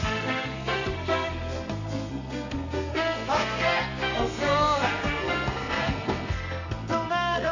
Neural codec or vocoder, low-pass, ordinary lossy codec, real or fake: codec, 32 kHz, 1.9 kbps, SNAC; 7.2 kHz; none; fake